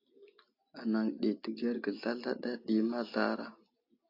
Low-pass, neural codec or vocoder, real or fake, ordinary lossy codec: 5.4 kHz; none; real; AAC, 32 kbps